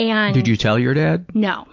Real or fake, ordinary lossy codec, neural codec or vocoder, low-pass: real; MP3, 64 kbps; none; 7.2 kHz